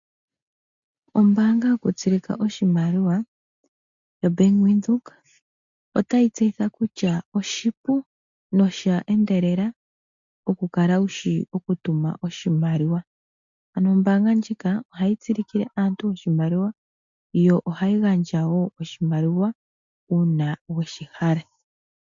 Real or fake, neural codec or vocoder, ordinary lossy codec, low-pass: real; none; MP3, 64 kbps; 7.2 kHz